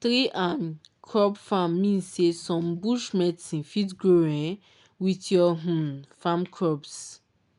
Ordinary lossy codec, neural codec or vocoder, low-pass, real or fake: MP3, 96 kbps; none; 10.8 kHz; real